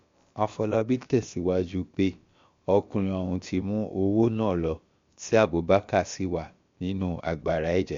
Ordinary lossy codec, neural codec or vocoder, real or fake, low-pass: MP3, 48 kbps; codec, 16 kHz, about 1 kbps, DyCAST, with the encoder's durations; fake; 7.2 kHz